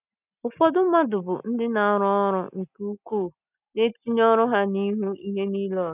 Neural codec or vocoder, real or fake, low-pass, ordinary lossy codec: none; real; 3.6 kHz; none